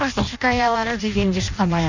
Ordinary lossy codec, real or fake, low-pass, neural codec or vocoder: none; fake; 7.2 kHz; codec, 16 kHz in and 24 kHz out, 0.6 kbps, FireRedTTS-2 codec